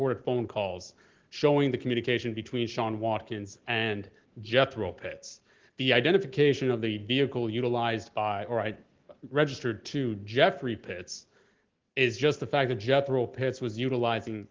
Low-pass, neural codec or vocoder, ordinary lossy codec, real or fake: 7.2 kHz; none; Opus, 16 kbps; real